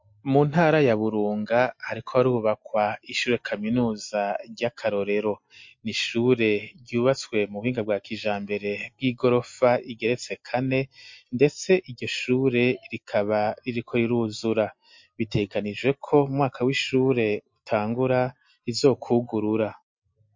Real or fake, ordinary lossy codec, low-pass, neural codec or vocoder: real; MP3, 48 kbps; 7.2 kHz; none